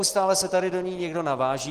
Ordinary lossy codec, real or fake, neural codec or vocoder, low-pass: Opus, 16 kbps; real; none; 14.4 kHz